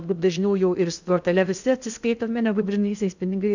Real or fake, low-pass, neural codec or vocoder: fake; 7.2 kHz; codec, 16 kHz in and 24 kHz out, 0.6 kbps, FocalCodec, streaming, 2048 codes